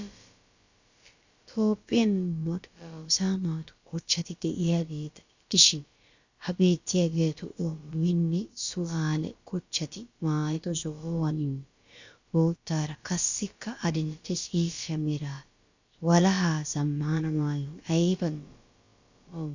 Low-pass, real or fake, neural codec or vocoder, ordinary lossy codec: 7.2 kHz; fake; codec, 16 kHz, about 1 kbps, DyCAST, with the encoder's durations; Opus, 64 kbps